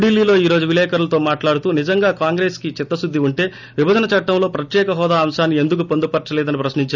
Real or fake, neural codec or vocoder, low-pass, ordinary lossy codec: real; none; 7.2 kHz; none